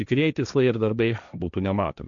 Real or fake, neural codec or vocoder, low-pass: fake; codec, 16 kHz, 1.1 kbps, Voila-Tokenizer; 7.2 kHz